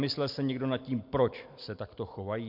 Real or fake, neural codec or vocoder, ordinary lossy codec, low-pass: real; none; MP3, 48 kbps; 5.4 kHz